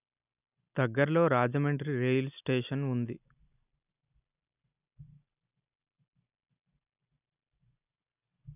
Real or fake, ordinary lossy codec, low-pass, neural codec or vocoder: real; none; 3.6 kHz; none